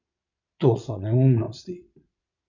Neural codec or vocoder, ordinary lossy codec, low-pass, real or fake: vocoder, 22.05 kHz, 80 mel bands, Vocos; none; 7.2 kHz; fake